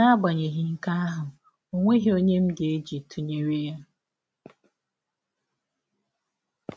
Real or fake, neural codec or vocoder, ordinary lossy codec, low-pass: real; none; none; none